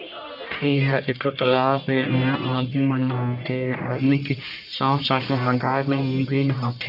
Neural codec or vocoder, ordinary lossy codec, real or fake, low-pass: codec, 44.1 kHz, 1.7 kbps, Pupu-Codec; none; fake; 5.4 kHz